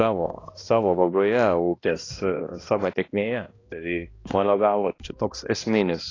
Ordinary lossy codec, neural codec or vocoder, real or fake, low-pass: AAC, 32 kbps; codec, 16 kHz, 1 kbps, X-Codec, HuBERT features, trained on balanced general audio; fake; 7.2 kHz